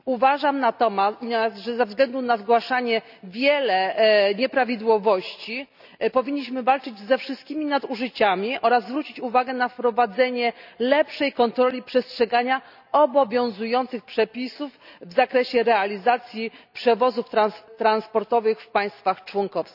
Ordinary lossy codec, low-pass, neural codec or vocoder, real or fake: none; 5.4 kHz; none; real